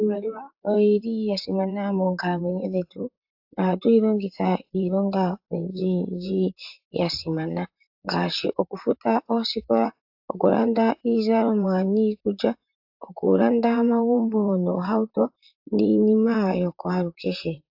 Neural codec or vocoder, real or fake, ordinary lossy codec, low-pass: vocoder, 44.1 kHz, 128 mel bands, Pupu-Vocoder; fake; AAC, 48 kbps; 5.4 kHz